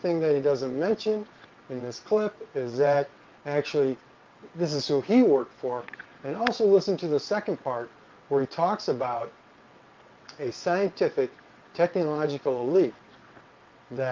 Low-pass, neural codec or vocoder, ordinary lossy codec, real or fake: 7.2 kHz; vocoder, 22.05 kHz, 80 mel bands, WaveNeXt; Opus, 24 kbps; fake